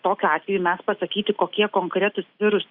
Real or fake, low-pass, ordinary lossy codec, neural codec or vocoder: real; 5.4 kHz; Opus, 64 kbps; none